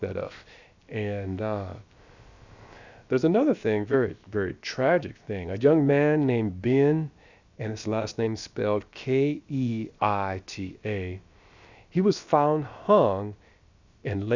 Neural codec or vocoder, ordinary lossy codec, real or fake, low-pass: codec, 16 kHz, about 1 kbps, DyCAST, with the encoder's durations; Opus, 64 kbps; fake; 7.2 kHz